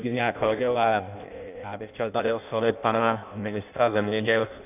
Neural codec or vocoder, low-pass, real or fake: codec, 16 kHz in and 24 kHz out, 0.6 kbps, FireRedTTS-2 codec; 3.6 kHz; fake